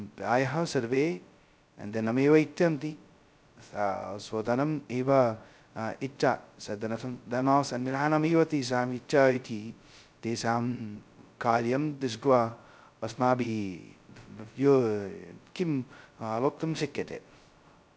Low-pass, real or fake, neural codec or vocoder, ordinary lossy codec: none; fake; codec, 16 kHz, 0.2 kbps, FocalCodec; none